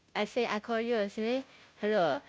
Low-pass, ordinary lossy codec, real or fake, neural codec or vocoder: none; none; fake; codec, 16 kHz, 0.5 kbps, FunCodec, trained on Chinese and English, 25 frames a second